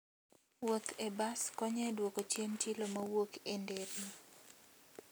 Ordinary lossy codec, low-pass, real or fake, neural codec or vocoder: none; none; real; none